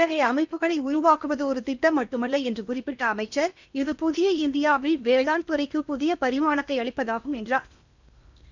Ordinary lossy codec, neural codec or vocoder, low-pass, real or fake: none; codec, 16 kHz in and 24 kHz out, 0.8 kbps, FocalCodec, streaming, 65536 codes; 7.2 kHz; fake